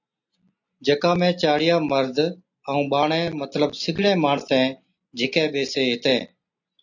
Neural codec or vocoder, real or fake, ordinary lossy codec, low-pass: none; real; AAC, 48 kbps; 7.2 kHz